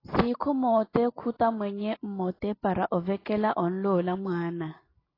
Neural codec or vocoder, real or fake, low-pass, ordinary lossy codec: none; real; 5.4 kHz; AAC, 24 kbps